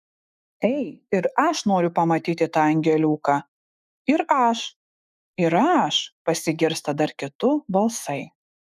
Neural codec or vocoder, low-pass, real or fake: autoencoder, 48 kHz, 128 numbers a frame, DAC-VAE, trained on Japanese speech; 14.4 kHz; fake